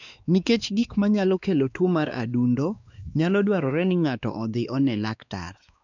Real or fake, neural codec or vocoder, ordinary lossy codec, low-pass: fake; codec, 16 kHz, 2 kbps, X-Codec, WavLM features, trained on Multilingual LibriSpeech; none; 7.2 kHz